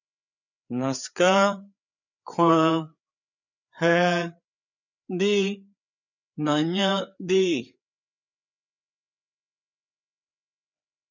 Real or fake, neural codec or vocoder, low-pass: fake; codec, 16 kHz, 4 kbps, FreqCodec, larger model; 7.2 kHz